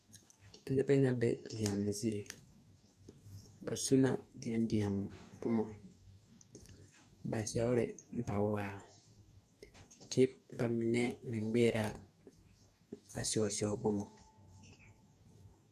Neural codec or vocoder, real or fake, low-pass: codec, 44.1 kHz, 2.6 kbps, DAC; fake; 14.4 kHz